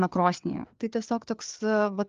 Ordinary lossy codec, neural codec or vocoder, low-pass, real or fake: Opus, 32 kbps; codec, 16 kHz, 4 kbps, FreqCodec, larger model; 7.2 kHz; fake